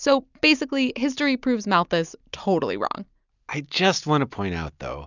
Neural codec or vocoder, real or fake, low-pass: none; real; 7.2 kHz